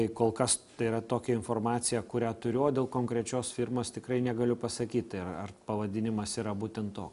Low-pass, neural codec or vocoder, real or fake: 10.8 kHz; none; real